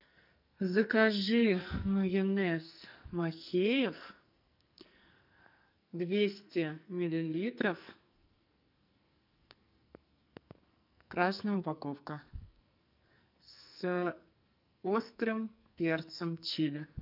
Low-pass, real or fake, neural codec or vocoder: 5.4 kHz; fake; codec, 44.1 kHz, 2.6 kbps, SNAC